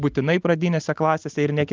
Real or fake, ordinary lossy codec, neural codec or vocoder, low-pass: real; Opus, 32 kbps; none; 7.2 kHz